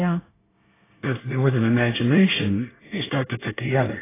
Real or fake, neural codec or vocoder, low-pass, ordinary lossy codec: fake; codec, 24 kHz, 1 kbps, SNAC; 3.6 kHz; AAC, 16 kbps